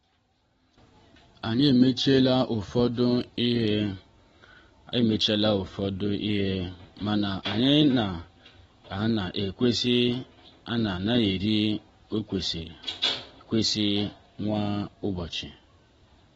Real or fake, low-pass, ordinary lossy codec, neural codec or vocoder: real; 19.8 kHz; AAC, 24 kbps; none